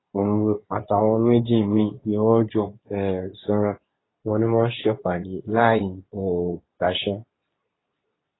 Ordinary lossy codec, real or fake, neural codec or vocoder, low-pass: AAC, 16 kbps; fake; codec, 24 kHz, 0.9 kbps, WavTokenizer, medium speech release version 2; 7.2 kHz